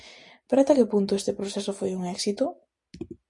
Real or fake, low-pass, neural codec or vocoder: real; 10.8 kHz; none